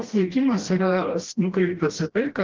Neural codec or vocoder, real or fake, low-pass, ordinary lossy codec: codec, 16 kHz, 1 kbps, FreqCodec, smaller model; fake; 7.2 kHz; Opus, 16 kbps